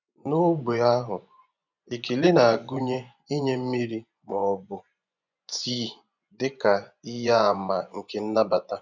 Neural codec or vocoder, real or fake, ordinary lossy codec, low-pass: vocoder, 24 kHz, 100 mel bands, Vocos; fake; none; 7.2 kHz